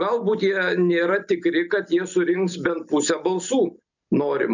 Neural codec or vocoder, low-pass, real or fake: none; 7.2 kHz; real